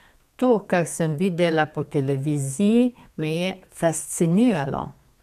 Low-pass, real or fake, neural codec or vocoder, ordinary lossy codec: 14.4 kHz; fake; codec, 32 kHz, 1.9 kbps, SNAC; none